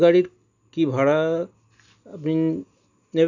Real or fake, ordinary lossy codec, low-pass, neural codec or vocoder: real; none; 7.2 kHz; none